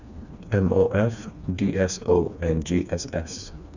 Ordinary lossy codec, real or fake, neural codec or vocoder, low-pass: none; fake; codec, 16 kHz, 2 kbps, FreqCodec, smaller model; 7.2 kHz